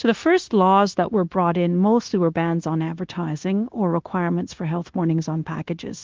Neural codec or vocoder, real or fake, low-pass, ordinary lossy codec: codec, 16 kHz, 0.9 kbps, LongCat-Audio-Codec; fake; 7.2 kHz; Opus, 16 kbps